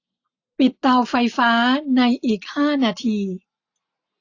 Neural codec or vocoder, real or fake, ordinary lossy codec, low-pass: none; real; AAC, 48 kbps; 7.2 kHz